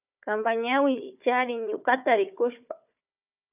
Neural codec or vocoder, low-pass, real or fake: codec, 16 kHz, 4 kbps, FunCodec, trained on Chinese and English, 50 frames a second; 3.6 kHz; fake